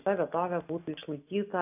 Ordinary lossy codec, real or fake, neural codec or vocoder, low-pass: AAC, 32 kbps; real; none; 3.6 kHz